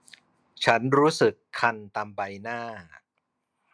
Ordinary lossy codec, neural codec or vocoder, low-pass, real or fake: none; none; none; real